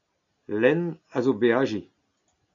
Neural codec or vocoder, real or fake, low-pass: none; real; 7.2 kHz